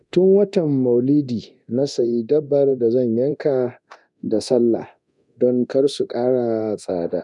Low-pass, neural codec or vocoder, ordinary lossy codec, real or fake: 10.8 kHz; codec, 24 kHz, 0.9 kbps, DualCodec; none; fake